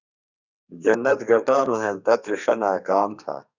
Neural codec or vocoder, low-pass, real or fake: codec, 32 kHz, 1.9 kbps, SNAC; 7.2 kHz; fake